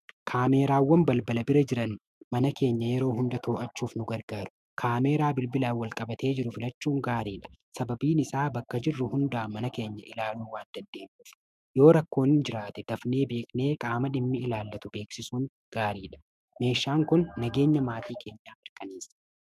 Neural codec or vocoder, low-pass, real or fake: autoencoder, 48 kHz, 128 numbers a frame, DAC-VAE, trained on Japanese speech; 14.4 kHz; fake